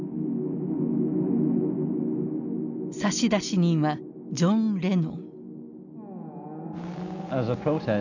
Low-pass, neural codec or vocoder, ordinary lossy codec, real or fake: 7.2 kHz; none; none; real